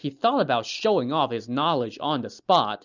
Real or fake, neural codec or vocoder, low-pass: real; none; 7.2 kHz